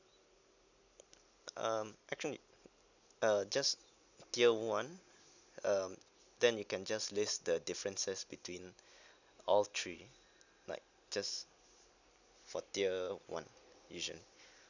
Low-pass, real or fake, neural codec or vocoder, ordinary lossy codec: 7.2 kHz; real; none; none